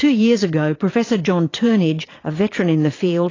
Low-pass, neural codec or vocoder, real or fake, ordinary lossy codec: 7.2 kHz; vocoder, 22.05 kHz, 80 mel bands, Vocos; fake; AAC, 32 kbps